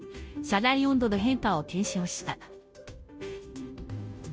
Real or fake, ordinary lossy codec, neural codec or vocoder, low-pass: fake; none; codec, 16 kHz, 0.5 kbps, FunCodec, trained on Chinese and English, 25 frames a second; none